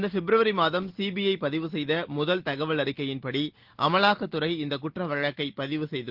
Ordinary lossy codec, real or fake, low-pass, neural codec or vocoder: Opus, 16 kbps; real; 5.4 kHz; none